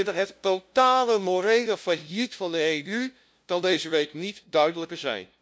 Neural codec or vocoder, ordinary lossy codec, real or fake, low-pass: codec, 16 kHz, 0.5 kbps, FunCodec, trained on LibriTTS, 25 frames a second; none; fake; none